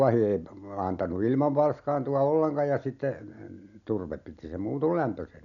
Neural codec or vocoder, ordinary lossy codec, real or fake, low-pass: none; none; real; 7.2 kHz